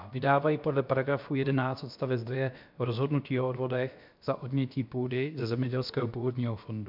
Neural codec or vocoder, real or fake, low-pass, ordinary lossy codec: codec, 16 kHz, about 1 kbps, DyCAST, with the encoder's durations; fake; 5.4 kHz; MP3, 48 kbps